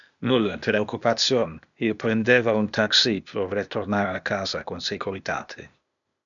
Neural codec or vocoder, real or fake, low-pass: codec, 16 kHz, 0.8 kbps, ZipCodec; fake; 7.2 kHz